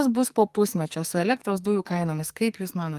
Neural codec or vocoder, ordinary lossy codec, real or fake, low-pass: codec, 44.1 kHz, 2.6 kbps, SNAC; Opus, 32 kbps; fake; 14.4 kHz